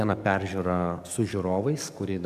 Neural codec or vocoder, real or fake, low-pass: codec, 44.1 kHz, 7.8 kbps, DAC; fake; 14.4 kHz